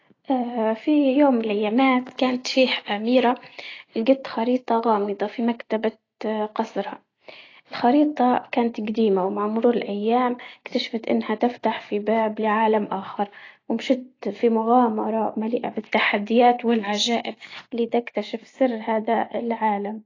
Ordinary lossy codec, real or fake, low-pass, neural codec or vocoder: AAC, 32 kbps; real; 7.2 kHz; none